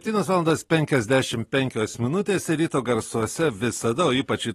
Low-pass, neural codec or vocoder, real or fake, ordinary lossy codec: 19.8 kHz; none; real; AAC, 32 kbps